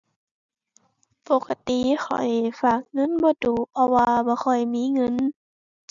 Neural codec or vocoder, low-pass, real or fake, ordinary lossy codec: none; 7.2 kHz; real; none